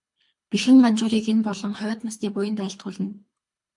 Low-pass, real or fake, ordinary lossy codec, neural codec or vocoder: 10.8 kHz; fake; MP3, 64 kbps; codec, 24 kHz, 3 kbps, HILCodec